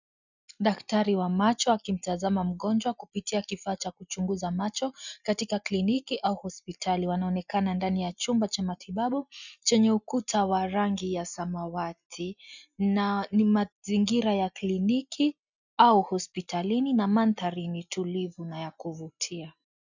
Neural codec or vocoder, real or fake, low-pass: none; real; 7.2 kHz